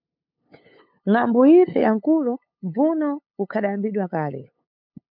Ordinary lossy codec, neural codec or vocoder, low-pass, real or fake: MP3, 48 kbps; codec, 16 kHz, 8 kbps, FunCodec, trained on LibriTTS, 25 frames a second; 5.4 kHz; fake